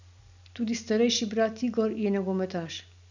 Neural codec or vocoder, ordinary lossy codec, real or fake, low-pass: none; none; real; 7.2 kHz